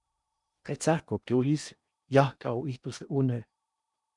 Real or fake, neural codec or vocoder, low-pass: fake; codec, 16 kHz in and 24 kHz out, 0.8 kbps, FocalCodec, streaming, 65536 codes; 10.8 kHz